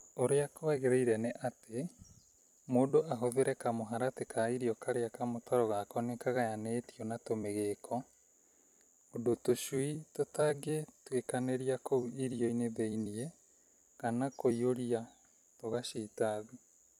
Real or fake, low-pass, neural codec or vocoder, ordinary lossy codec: fake; 19.8 kHz; vocoder, 44.1 kHz, 128 mel bands every 256 samples, BigVGAN v2; none